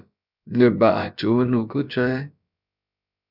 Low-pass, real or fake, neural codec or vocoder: 5.4 kHz; fake; codec, 16 kHz, about 1 kbps, DyCAST, with the encoder's durations